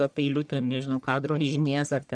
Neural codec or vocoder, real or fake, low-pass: codec, 44.1 kHz, 1.7 kbps, Pupu-Codec; fake; 9.9 kHz